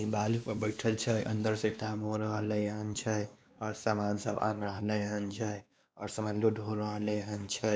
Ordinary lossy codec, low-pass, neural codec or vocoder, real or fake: none; none; codec, 16 kHz, 2 kbps, X-Codec, WavLM features, trained on Multilingual LibriSpeech; fake